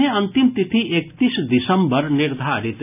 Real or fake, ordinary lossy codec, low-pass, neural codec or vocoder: real; none; 3.6 kHz; none